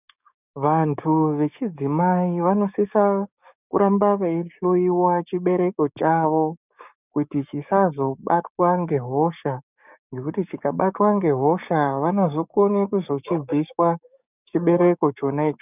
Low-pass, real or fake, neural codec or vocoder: 3.6 kHz; fake; codec, 16 kHz, 6 kbps, DAC